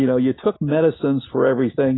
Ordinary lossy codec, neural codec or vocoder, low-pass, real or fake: AAC, 16 kbps; none; 7.2 kHz; real